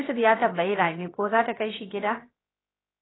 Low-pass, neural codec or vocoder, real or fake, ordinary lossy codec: 7.2 kHz; codec, 16 kHz, 0.7 kbps, FocalCodec; fake; AAC, 16 kbps